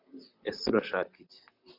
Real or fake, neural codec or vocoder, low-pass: fake; vocoder, 44.1 kHz, 128 mel bands, Pupu-Vocoder; 5.4 kHz